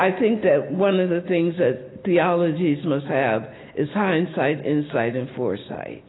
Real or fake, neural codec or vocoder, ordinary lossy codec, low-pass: real; none; AAC, 16 kbps; 7.2 kHz